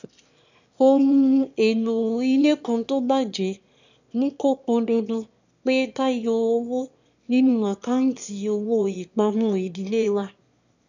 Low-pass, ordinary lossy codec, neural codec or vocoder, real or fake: 7.2 kHz; none; autoencoder, 22.05 kHz, a latent of 192 numbers a frame, VITS, trained on one speaker; fake